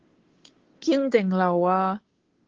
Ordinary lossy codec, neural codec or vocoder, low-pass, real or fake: Opus, 16 kbps; codec, 16 kHz, 2 kbps, FunCodec, trained on Chinese and English, 25 frames a second; 7.2 kHz; fake